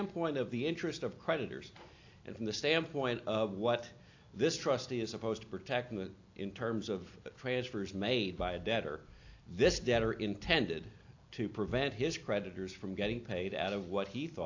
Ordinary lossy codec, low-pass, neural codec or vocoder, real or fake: AAC, 48 kbps; 7.2 kHz; none; real